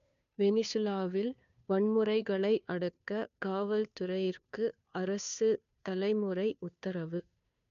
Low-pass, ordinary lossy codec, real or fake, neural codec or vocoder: 7.2 kHz; none; fake; codec, 16 kHz, 2 kbps, FunCodec, trained on Chinese and English, 25 frames a second